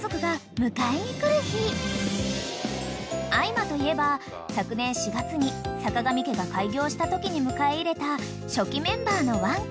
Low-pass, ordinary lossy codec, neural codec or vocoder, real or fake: none; none; none; real